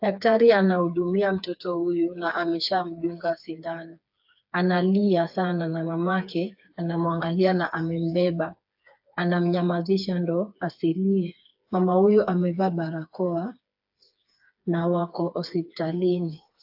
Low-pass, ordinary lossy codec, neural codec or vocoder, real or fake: 5.4 kHz; AAC, 48 kbps; codec, 16 kHz, 4 kbps, FreqCodec, smaller model; fake